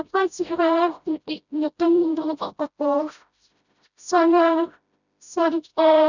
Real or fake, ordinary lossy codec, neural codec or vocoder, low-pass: fake; none; codec, 16 kHz, 0.5 kbps, FreqCodec, smaller model; 7.2 kHz